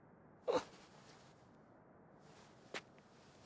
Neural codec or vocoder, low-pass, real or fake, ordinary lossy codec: none; none; real; none